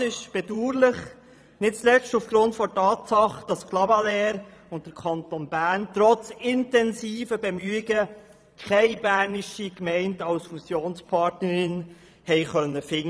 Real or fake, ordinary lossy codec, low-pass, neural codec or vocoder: fake; none; none; vocoder, 22.05 kHz, 80 mel bands, Vocos